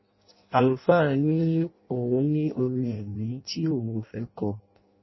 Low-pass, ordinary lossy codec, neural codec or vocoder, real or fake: 7.2 kHz; MP3, 24 kbps; codec, 16 kHz in and 24 kHz out, 0.6 kbps, FireRedTTS-2 codec; fake